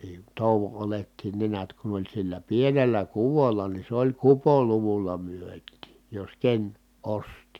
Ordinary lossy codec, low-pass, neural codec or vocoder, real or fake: none; 19.8 kHz; none; real